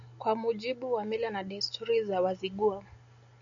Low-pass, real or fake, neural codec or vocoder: 7.2 kHz; real; none